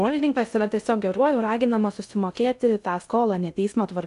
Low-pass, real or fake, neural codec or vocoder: 10.8 kHz; fake; codec, 16 kHz in and 24 kHz out, 0.6 kbps, FocalCodec, streaming, 2048 codes